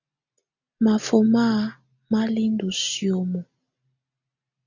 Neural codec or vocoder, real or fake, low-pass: none; real; 7.2 kHz